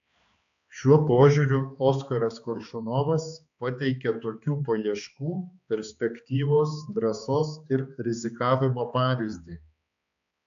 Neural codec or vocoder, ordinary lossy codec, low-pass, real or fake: codec, 16 kHz, 2 kbps, X-Codec, HuBERT features, trained on balanced general audio; AAC, 64 kbps; 7.2 kHz; fake